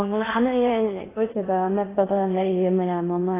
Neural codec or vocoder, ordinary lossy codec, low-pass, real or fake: codec, 16 kHz in and 24 kHz out, 0.6 kbps, FocalCodec, streaming, 2048 codes; AAC, 16 kbps; 3.6 kHz; fake